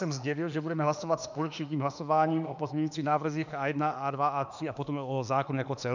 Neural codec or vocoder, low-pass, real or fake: autoencoder, 48 kHz, 32 numbers a frame, DAC-VAE, trained on Japanese speech; 7.2 kHz; fake